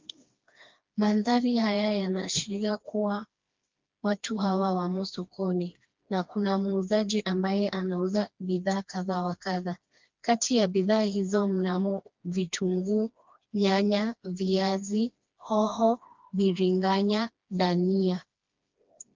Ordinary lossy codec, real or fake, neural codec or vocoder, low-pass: Opus, 24 kbps; fake; codec, 16 kHz, 2 kbps, FreqCodec, smaller model; 7.2 kHz